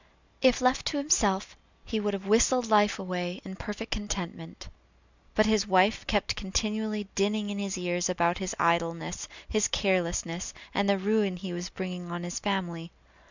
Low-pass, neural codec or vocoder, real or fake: 7.2 kHz; none; real